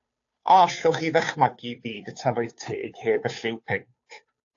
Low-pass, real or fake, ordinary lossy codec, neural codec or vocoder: 7.2 kHz; fake; AAC, 48 kbps; codec, 16 kHz, 2 kbps, FunCodec, trained on Chinese and English, 25 frames a second